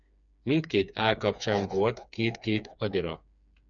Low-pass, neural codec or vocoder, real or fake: 7.2 kHz; codec, 16 kHz, 4 kbps, FreqCodec, smaller model; fake